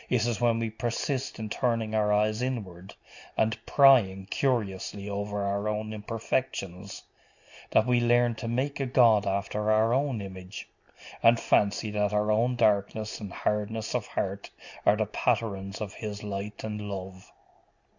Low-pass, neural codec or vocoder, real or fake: 7.2 kHz; none; real